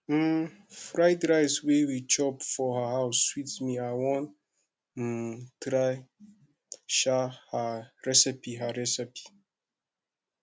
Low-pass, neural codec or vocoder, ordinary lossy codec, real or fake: none; none; none; real